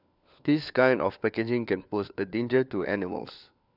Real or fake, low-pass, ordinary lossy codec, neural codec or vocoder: fake; 5.4 kHz; none; codec, 16 kHz, 4 kbps, FunCodec, trained on LibriTTS, 50 frames a second